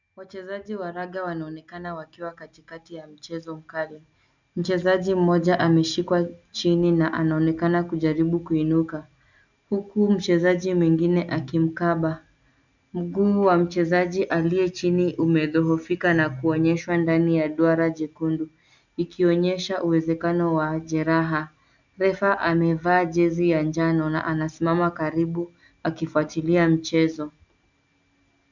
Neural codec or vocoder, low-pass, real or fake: none; 7.2 kHz; real